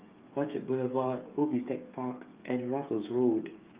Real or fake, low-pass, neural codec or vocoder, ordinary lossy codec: fake; 3.6 kHz; codec, 16 kHz, 8 kbps, FreqCodec, smaller model; Opus, 24 kbps